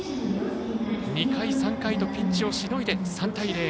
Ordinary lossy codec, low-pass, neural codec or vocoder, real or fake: none; none; none; real